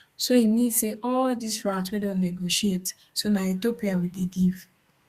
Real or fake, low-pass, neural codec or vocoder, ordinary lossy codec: fake; 14.4 kHz; codec, 32 kHz, 1.9 kbps, SNAC; Opus, 64 kbps